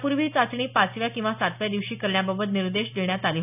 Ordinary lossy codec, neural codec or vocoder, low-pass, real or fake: none; none; 3.6 kHz; real